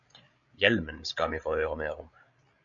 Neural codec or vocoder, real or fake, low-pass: codec, 16 kHz, 8 kbps, FreqCodec, larger model; fake; 7.2 kHz